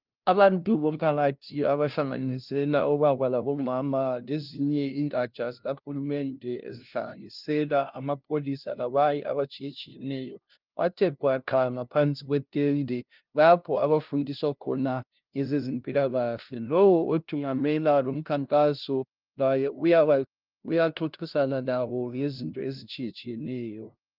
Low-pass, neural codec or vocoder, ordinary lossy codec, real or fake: 5.4 kHz; codec, 16 kHz, 0.5 kbps, FunCodec, trained on LibriTTS, 25 frames a second; Opus, 24 kbps; fake